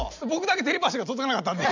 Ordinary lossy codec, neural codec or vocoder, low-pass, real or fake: none; none; 7.2 kHz; real